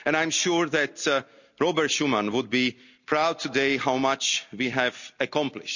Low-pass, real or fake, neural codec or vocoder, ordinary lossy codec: 7.2 kHz; real; none; none